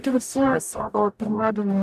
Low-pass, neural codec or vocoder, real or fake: 14.4 kHz; codec, 44.1 kHz, 0.9 kbps, DAC; fake